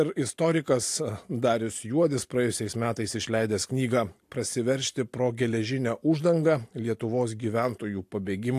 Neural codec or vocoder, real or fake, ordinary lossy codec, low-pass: none; real; AAC, 64 kbps; 14.4 kHz